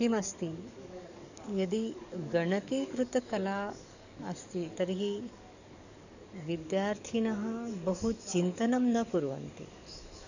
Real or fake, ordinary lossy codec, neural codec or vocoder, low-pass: fake; none; codec, 44.1 kHz, 7.8 kbps, DAC; 7.2 kHz